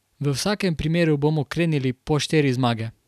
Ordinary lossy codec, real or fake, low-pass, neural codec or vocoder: none; real; 14.4 kHz; none